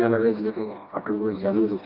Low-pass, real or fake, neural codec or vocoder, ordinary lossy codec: 5.4 kHz; fake; codec, 16 kHz, 1 kbps, FreqCodec, smaller model; none